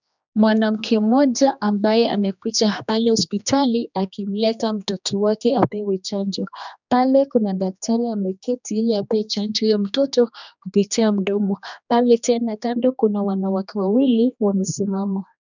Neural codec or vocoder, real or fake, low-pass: codec, 16 kHz, 2 kbps, X-Codec, HuBERT features, trained on general audio; fake; 7.2 kHz